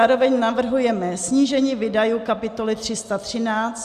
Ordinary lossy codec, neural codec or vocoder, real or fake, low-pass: Opus, 64 kbps; none; real; 14.4 kHz